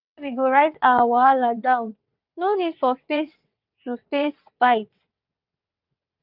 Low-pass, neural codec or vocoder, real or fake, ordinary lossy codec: 5.4 kHz; vocoder, 22.05 kHz, 80 mel bands, WaveNeXt; fake; none